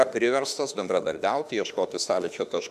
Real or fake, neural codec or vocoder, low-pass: fake; autoencoder, 48 kHz, 32 numbers a frame, DAC-VAE, trained on Japanese speech; 14.4 kHz